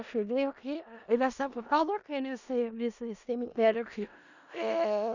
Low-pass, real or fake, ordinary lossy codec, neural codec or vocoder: 7.2 kHz; fake; none; codec, 16 kHz in and 24 kHz out, 0.4 kbps, LongCat-Audio-Codec, four codebook decoder